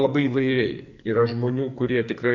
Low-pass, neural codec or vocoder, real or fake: 7.2 kHz; codec, 44.1 kHz, 2.6 kbps, SNAC; fake